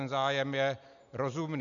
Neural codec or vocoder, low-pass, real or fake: none; 7.2 kHz; real